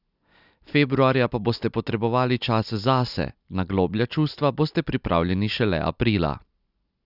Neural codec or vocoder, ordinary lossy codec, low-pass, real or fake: none; none; 5.4 kHz; real